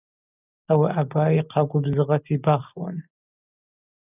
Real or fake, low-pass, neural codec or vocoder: real; 3.6 kHz; none